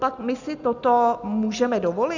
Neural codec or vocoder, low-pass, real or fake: none; 7.2 kHz; real